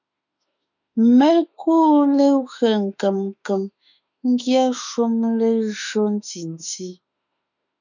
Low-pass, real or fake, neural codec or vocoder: 7.2 kHz; fake; autoencoder, 48 kHz, 32 numbers a frame, DAC-VAE, trained on Japanese speech